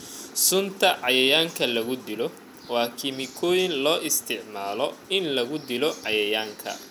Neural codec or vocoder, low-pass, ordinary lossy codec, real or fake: none; 19.8 kHz; none; real